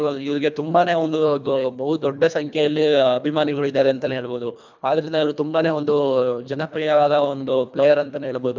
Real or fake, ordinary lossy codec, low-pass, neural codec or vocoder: fake; none; 7.2 kHz; codec, 24 kHz, 1.5 kbps, HILCodec